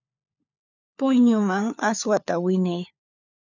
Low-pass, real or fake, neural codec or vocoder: 7.2 kHz; fake; codec, 16 kHz, 4 kbps, FunCodec, trained on LibriTTS, 50 frames a second